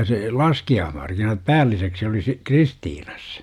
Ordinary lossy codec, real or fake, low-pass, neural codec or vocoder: none; real; 19.8 kHz; none